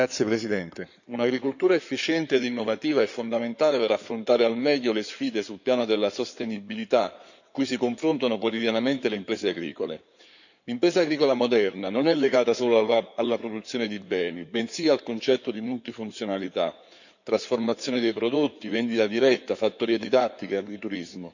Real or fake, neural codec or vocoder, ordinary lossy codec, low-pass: fake; codec, 16 kHz in and 24 kHz out, 2.2 kbps, FireRedTTS-2 codec; none; 7.2 kHz